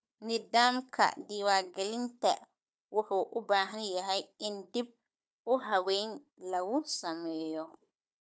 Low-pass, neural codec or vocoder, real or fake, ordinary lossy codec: none; codec, 16 kHz, 4 kbps, FunCodec, trained on Chinese and English, 50 frames a second; fake; none